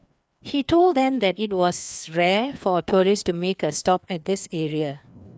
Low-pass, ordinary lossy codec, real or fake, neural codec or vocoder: none; none; fake; codec, 16 kHz, 2 kbps, FreqCodec, larger model